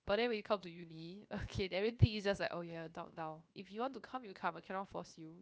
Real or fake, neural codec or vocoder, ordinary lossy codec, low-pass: fake; codec, 16 kHz, 0.7 kbps, FocalCodec; none; none